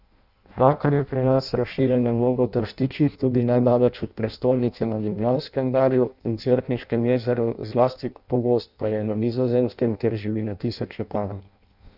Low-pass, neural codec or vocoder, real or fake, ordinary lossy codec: 5.4 kHz; codec, 16 kHz in and 24 kHz out, 0.6 kbps, FireRedTTS-2 codec; fake; none